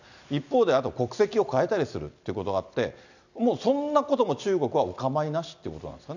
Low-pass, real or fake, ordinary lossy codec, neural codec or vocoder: 7.2 kHz; real; none; none